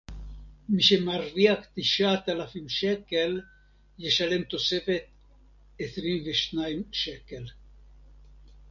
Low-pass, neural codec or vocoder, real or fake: 7.2 kHz; none; real